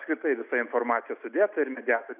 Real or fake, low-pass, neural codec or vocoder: real; 3.6 kHz; none